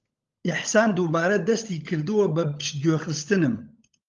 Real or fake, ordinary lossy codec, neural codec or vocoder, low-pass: fake; Opus, 32 kbps; codec, 16 kHz, 16 kbps, FunCodec, trained on LibriTTS, 50 frames a second; 7.2 kHz